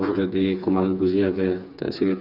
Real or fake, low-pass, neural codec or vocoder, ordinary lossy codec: fake; 5.4 kHz; codec, 16 kHz, 4 kbps, FreqCodec, smaller model; none